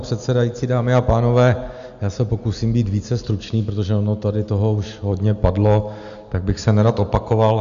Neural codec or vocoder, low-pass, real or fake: none; 7.2 kHz; real